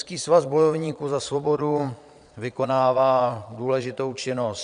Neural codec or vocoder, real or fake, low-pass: vocoder, 22.05 kHz, 80 mel bands, Vocos; fake; 9.9 kHz